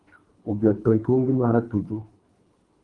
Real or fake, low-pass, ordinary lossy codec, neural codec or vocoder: fake; 10.8 kHz; Opus, 24 kbps; codec, 24 kHz, 3 kbps, HILCodec